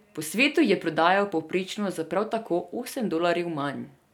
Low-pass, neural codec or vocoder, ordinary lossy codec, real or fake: 19.8 kHz; none; none; real